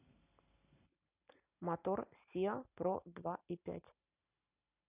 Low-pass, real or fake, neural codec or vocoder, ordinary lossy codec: 3.6 kHz; real; none; none